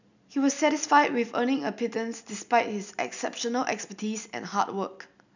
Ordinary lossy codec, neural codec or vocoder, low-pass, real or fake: none; none; 7.2 kHz; real